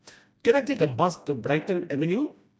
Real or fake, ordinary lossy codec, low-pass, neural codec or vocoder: fake; none; none; codec, 16 kHz, 1 kbps, FreqCodec, smaller model